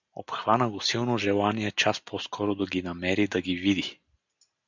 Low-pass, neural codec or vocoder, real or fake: 7.2 kHz; none; real